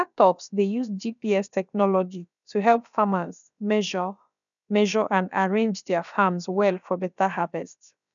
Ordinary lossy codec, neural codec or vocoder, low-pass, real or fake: none; codec, 16 kHz, about 1 kbps, DyCAST, with the encoder's durations; 7.2 kHz; fake